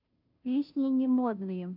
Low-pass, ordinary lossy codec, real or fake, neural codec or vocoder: 5.4 kHz; none; fake; codec, 16 kHz, 0.5 kbps, FunCodec, trained on Chinese and English, 25 frames a second